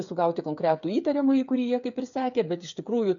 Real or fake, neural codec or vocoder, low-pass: fake; codec, 16 kHz, 8 kbps, FreqCodec, smaller model; 7.2 kHz